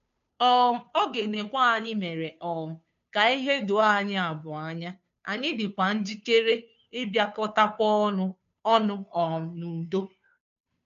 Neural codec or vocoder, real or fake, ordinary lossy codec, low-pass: codec, 16 kHz, 2 kbps, FunCodec, trained on Chinese and English, 25 frames a second; fake; none; 7.2 kHz